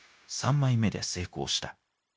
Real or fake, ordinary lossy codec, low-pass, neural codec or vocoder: fake; none; none; codec, 16 kHz, 0.9 kbps, LongCat-Audio-Codec